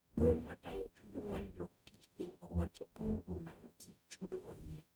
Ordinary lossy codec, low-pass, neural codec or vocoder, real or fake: none; none; codec, 44.1 kHz, 0.9 kbps, DAC; fake